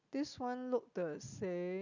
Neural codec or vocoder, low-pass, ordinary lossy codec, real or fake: none; 7.2 kHz; none; real